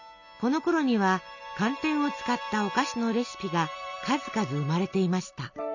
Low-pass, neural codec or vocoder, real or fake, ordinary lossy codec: 7.2 kHz; none; real; none